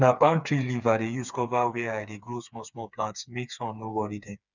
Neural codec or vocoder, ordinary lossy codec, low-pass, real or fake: codec, 16 kHz, 4 kbps, FreqCodec, smaller model; none; 7.2 kHz; fake